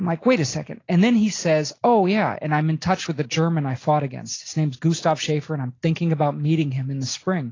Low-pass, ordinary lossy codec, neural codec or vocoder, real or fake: 7.2 kHz; AAC, 32 kbps; none; real